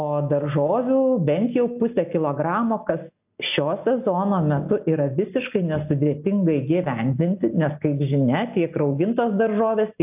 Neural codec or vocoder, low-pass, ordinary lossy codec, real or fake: none; 3.6 kHz; AAC, 32 kbps; real